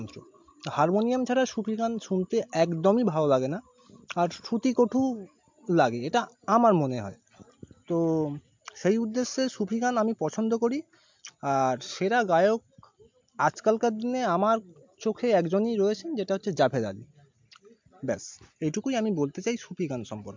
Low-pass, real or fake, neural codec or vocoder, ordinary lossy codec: 7.2 kHz; real; none; MP3, 64 kbps